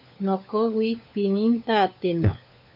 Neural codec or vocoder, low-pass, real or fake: codec, 16 kHz, 4 kbps, FunCodec, trained on LibriTTS, 50 frames a second; 5.4 kHz; fake